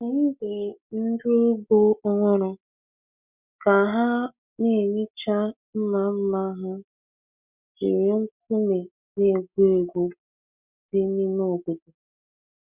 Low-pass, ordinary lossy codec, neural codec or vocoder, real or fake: 3.6 kHz; none; none; real